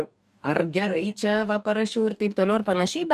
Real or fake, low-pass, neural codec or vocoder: fake; 14.4 kHz; codec, 44.1 kHz, 2.6 kbps, DAC